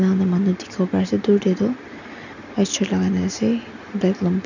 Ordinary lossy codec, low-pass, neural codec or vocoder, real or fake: none; 7.2 kHz; none; real